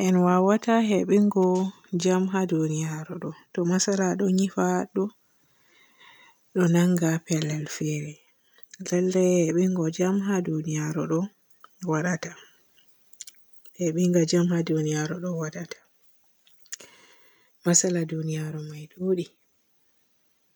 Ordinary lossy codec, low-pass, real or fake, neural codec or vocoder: none; none; real; none